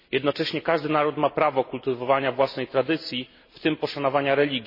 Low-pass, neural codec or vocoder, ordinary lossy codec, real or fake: 5.4 kHz; none; MP3, 24 kbps; real